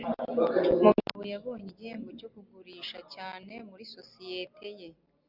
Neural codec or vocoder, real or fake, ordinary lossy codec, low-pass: none; real; Opus, 64 kbps; 5.4 kHz